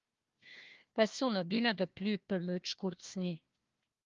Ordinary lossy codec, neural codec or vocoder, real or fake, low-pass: Opus, 32 kbps; codec, 16 kHz, 1 kbps, FunCodec, trained on Chinese and English, 50 frames a second; fake; 7.2 kHz